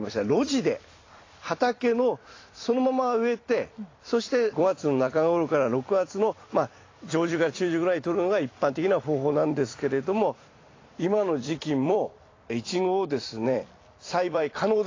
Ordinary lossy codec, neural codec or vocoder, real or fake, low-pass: AAC, 32 kbps; vocoder, 44.1 kHz, 128 mel bands every 256 samples, BigVGAN v2; fake; 7.2 kHz